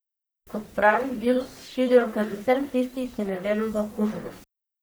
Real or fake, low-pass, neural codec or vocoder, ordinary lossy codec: fake; none; codec, 44.1 kHz, 1.7 kbps, Pupu-Codec; none